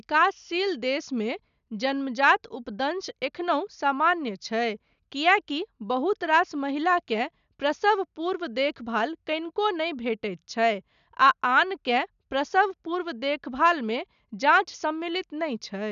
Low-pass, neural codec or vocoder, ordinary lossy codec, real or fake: 7.2 kHz; none; none; real